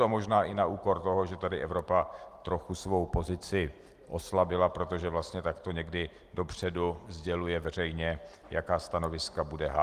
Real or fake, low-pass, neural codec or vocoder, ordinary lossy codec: real; 14.4 kHz; none; Opus, 24 kbps